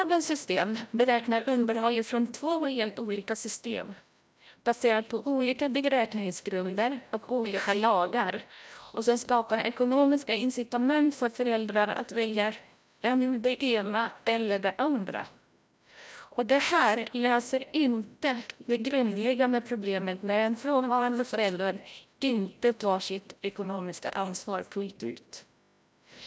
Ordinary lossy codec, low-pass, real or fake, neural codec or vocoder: none; none; fake; codec, 16 kHz, 0.5 kbps, FreqCodec, larger model